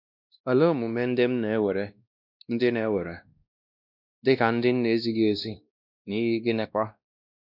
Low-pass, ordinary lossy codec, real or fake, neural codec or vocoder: 5.4 kHz; none; fake; codec, 16 kHz, 1 kbps, X-Codec, WavLM features, trained on Multilingual LibriSpeech